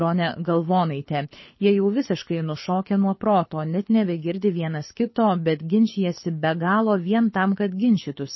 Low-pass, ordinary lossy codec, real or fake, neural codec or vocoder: 7.2 kHz; MP3, 24 kbps; fake; codec, 24 kHz, 6 kbps, HILCodec